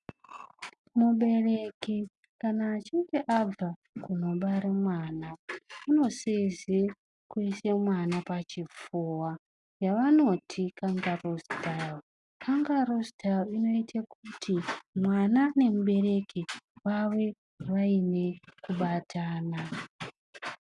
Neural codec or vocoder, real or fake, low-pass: none; real; 10.8 kHz